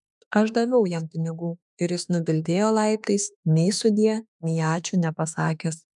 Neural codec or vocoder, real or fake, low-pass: autoencoder, 48 kHz, 32 numbers a frame, DAC-VAE, trained on Japanese speech; fake; 10.8 kHz